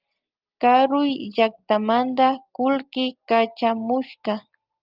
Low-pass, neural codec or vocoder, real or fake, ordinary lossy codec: 5.4 kHz; none; real; Opus, 32 kbps